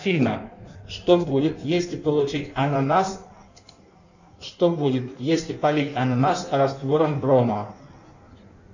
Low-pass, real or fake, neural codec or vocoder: 7.2 kHz; fake; codec, 16 kHz in and 24 kHz out, 1.1 kbps, FireRedTTS-2 codec